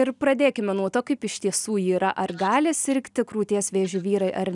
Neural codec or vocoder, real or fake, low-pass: none; real; 10.8 kHz